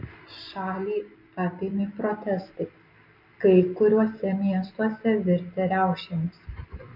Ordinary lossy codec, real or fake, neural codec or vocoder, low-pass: MP3, 48 kbps; real; none; 5.4 kHz